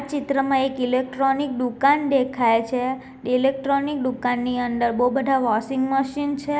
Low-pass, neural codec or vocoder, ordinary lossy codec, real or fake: none; none; none; real